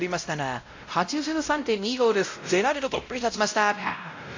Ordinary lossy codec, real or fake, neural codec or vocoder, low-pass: AAC, 48 kbps; fake; codec, 16 kHz, 0.5 kbps, X-Codec, WavLM features, trained on Multilingual LibriSpeech; 7.2 kHz